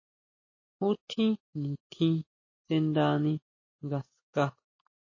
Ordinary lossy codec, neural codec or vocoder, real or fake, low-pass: MP3, 32 kbps; none; real; 7.2 kHz